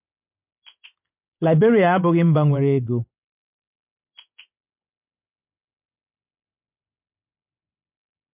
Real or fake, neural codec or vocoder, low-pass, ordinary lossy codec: fake; vocoder, 24 kHz, 100 mel bands, Vocos; 3.6 kHz; MP3, 32 kbps